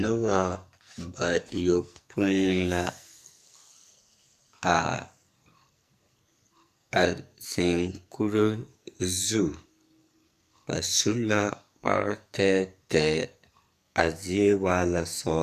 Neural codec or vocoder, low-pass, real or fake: codec, 44.1 kHz, 2.6 kbps, SNAC; 14.4 kHz; fake